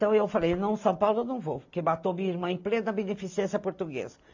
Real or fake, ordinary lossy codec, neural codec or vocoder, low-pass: real; AAC, 48 kbps; none; 7.2 kHz